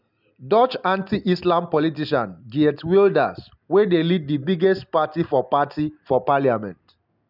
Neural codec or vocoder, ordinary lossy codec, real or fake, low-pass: none; none; real; 5.4 kHz